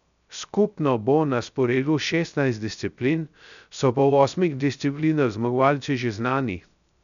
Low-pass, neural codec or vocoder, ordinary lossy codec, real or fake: 7.2 kHz; codec, 16 kHz, 0.3 kbps, FocalCodec; none; fake